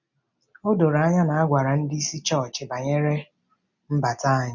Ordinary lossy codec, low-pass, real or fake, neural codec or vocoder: none; 7.2 kHz; real; none